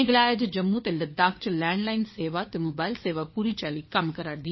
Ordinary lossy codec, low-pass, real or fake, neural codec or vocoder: MP3, 24 kbps; 7.2 kHz; fake; codec, 16 kHz, 4 kbps, FunCodec, trained on LibriTTS, 50 frames a second